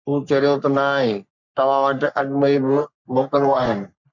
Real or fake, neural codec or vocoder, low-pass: fake; codec, 44.1 kHz, 3.4 kbps, Pupu-Codec; 7.2 kHz